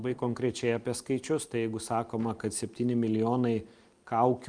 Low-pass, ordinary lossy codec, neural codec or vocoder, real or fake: 9.9 kHz; Opus, 32 kbps; none; real